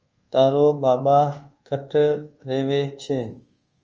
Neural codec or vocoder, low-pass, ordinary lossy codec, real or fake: codec, 24 kHz, 1.2 kbps, DualCodec; 7.2 kHz; Opus, 24 kbps; fake